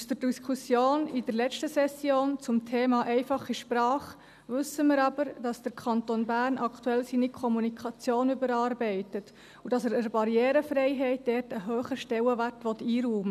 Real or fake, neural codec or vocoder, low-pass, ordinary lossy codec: real; none; 14.4 kHz; none